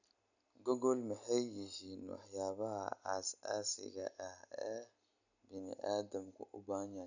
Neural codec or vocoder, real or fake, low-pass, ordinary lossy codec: none; real; 7.2 kHz; none